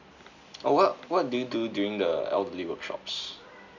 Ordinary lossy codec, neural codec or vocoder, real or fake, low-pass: none; none; real; 7.2 kHz